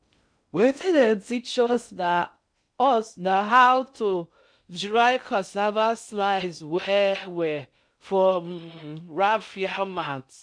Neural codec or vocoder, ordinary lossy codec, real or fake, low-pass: codec, 16 kHz in and 24 kHz out, 0.6 kbps, FocalCodec, streaming, 2048 codes; none; fake; 9.9 kHz